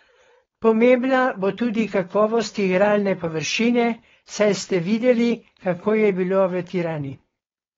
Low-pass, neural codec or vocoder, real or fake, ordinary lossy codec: 7.2 kHz; codec, 16 kHz, 4.8 kbps, FACodec; fake; AAC, 24 kbps